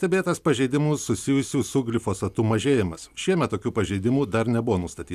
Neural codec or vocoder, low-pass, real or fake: none; 14.4 kHz; real